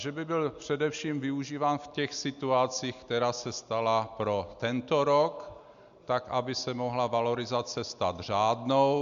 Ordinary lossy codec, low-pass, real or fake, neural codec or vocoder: AAC, 96 kbps; 7.2 kHz; real; none